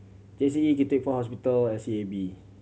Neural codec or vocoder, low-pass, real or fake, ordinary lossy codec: none; none; real; none